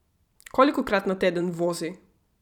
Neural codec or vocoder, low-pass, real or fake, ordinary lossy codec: vocoder, 44.1 kHz, 128 mel bands every 512 samples, BigVGAN v2; 19.8 kHz; fake; none